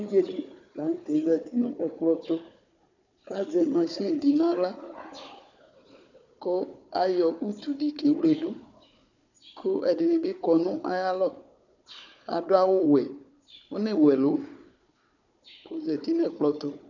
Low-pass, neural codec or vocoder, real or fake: 7.2 kHz; codec, 16 kHz, 4 kbps, FunCodec, trained on Chinese and English, 50 frames a second; fake